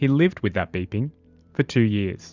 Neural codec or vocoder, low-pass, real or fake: vocoder, 44.1 kHz, 128 mel bands every 512 samples, BigVGAN v2; 7.2 kHz; fake